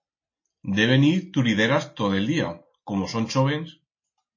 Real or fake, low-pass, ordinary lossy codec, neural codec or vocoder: real; 7.2 kHz; MP3, 32 kbps; none